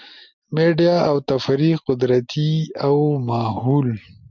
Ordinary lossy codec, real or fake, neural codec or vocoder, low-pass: MP3, 64 kbps; real; none; 7.2 kHz